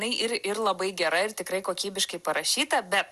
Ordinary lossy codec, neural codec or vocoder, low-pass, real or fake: Opus, 64 kbps; none; 14.4 kHz; real